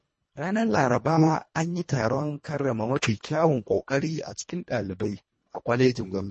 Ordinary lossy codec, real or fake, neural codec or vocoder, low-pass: MP3, 32 kbps; fake; codec, 24 kHz, 1.5 kbps, HILCodec; 10.8 kHz